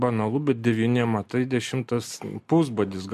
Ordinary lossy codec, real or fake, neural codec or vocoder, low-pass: MP3, 64 kbps; real; none; 14.4 kHz